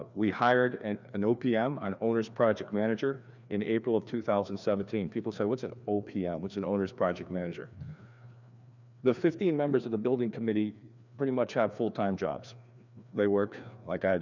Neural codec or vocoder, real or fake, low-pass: codec, 16 kHz, 2 kbps, FreqCodec, larger model; fake; 7.2 kHz